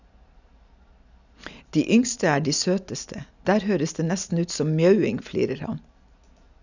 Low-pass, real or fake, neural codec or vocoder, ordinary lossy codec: 7.2 kHz; real; none; none